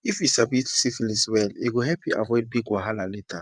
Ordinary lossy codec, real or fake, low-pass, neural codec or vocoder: none; fake; 9.9 kHz; vocoder, 22.05 kHz, 80 mel bands, WaveNeXt